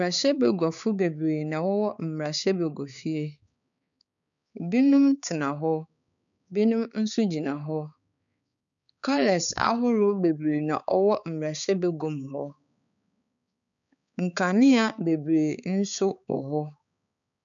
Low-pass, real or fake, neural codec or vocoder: 7.2 kHz; fake; codec, 16 kHz, 4 kbps, X-Codec, HuBERT features, trained on balanced general audio